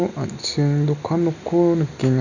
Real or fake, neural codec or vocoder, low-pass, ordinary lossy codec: real; none; 7.2 kHz; none